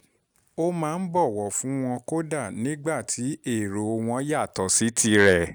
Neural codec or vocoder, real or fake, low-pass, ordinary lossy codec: none; real; none; none